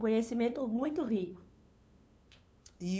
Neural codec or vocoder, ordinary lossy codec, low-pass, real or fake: codec, 16 kHz, 2 kbps, FunCodec, trained on LibriTTS, 25 frames a second; none; none; fake